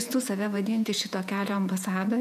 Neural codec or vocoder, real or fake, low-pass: vocoder, 48 kHz, 128 mel bands, Vocos; fake; 14.4 kHz